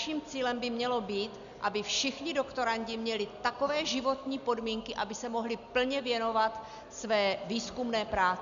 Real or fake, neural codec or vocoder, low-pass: real; none; 7.2 kHz